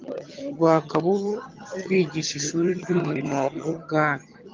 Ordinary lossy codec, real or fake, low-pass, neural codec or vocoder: Opus, 24 kbps; fake; 7.2 kHz; vocoder, 22.05 kHz, 80 mel bands, HiFi-GAN